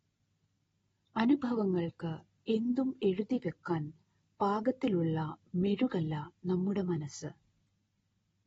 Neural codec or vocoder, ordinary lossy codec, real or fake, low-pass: none; AAC, 24 kbps; real; 14.4 kHz